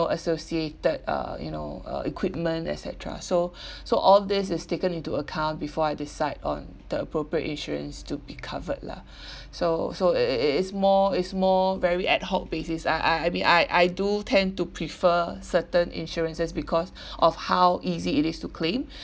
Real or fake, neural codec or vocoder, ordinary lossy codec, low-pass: real; none; none; none